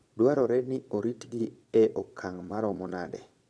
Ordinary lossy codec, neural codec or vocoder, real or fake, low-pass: none; vocoder, 22.05 kHz, 80 mel bands, Vocos; fake; none